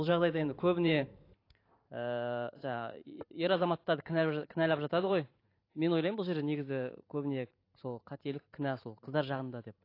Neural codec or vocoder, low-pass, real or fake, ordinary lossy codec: vocoder, 44.1 kHz, 128 mel bands every 512 samples, BigVGAN v2; 5.4 kHz; fake; AAC, 32 kbps